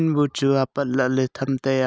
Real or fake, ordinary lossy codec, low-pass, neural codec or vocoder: real; none; none; none